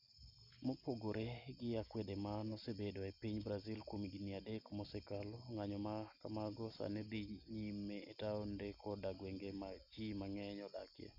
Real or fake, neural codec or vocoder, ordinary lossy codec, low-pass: real; none; none; 5.4 kHz